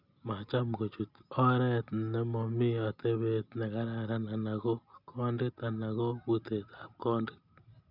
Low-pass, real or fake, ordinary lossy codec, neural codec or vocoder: 5.4 kHz; real; none; none